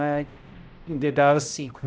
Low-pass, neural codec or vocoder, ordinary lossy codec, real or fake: none; codec, 16 kHz, 0.5 kbps, X-Codec, HuBERT features, trained on balanced general audio; none; fake